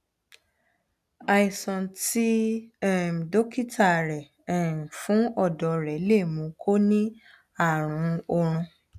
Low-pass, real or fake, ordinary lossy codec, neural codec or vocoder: 14.4 kHz; real; none; none